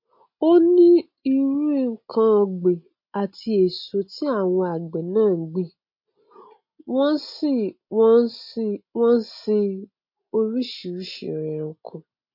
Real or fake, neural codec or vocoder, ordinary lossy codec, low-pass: real; none; MP3, 32 kbps; 5.4 kHz